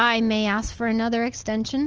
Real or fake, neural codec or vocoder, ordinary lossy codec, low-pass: real; none; Opus, 24 kbps; 7.2 kHz